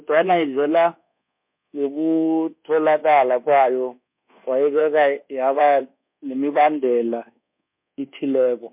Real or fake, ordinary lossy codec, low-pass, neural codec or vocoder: fake; MP3, 32 kbps; 3.6 kHz; codec, 24 kHz, 1.2 kbps, DualCodec